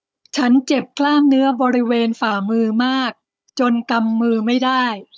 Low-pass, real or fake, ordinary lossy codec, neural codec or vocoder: none; fake; none; codec, 16 kHz, 16 kbps, FunCodec, trained on Chinese and English, 50 frames a second